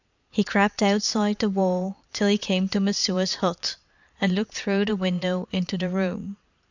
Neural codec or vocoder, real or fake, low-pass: vocoder, 22.05 kHz, 80 mel bands, Vocos; fake; 7.2 kHz